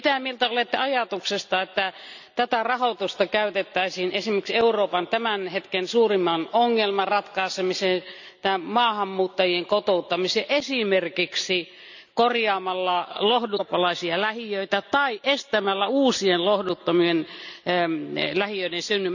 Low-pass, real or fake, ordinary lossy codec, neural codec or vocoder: 7.2 kHz; real; none; none